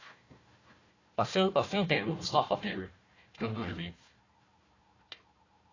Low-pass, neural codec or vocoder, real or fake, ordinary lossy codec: 7.2 kHz; codec, 16 kHz, 1 kbps, FunCodec, trained on Chinese and English, 50 frames a second; fake; AAC, 32 kbps